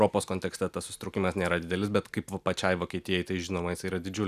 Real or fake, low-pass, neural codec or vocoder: real; 14.4 kHz; none